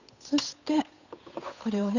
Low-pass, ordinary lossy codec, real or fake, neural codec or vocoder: 7.2 kHz; none; fake; codec, 16 kHz, 8 kbps, FunCodec, trained on Chinese and English, 25 frames a second